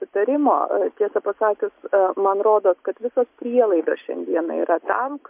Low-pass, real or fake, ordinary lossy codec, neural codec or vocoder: 3.6 kHz; real; MP3, 32 kbps; none